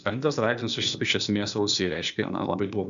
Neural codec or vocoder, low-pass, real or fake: codec, 16 kHz, 0.8 kbps, ZipCodec; 7.2 kHz; fake